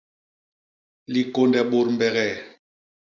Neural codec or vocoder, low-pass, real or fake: none; 7.2 kHz; real